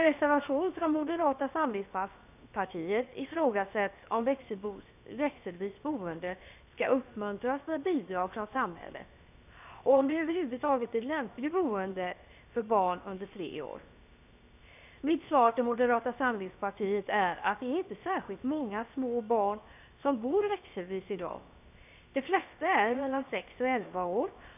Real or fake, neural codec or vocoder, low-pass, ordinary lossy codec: fake; codec, 16 kHz, 0.7 kbps, FocalCodec; 3.6 kHz; none